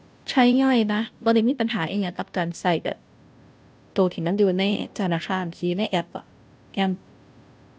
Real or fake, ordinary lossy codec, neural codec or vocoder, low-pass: fake; none; codec, 16 kHz, 0.5 kbps, FunCodec, trained on Chinese and English, 25 frames a second; none